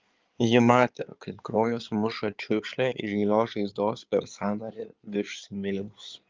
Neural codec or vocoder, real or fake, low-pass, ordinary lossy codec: codec, 16 kHz in and 24 kHz out, 2.2 kbps, FireRedTTS-2 codec; fake; 7.2 kHz; Opus, 24 kbps